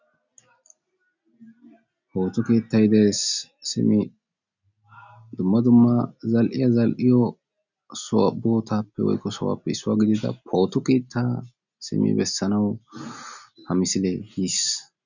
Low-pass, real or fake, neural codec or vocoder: 7.2 kHz; real; none